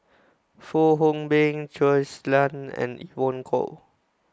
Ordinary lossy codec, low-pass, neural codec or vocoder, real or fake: none; none; none; real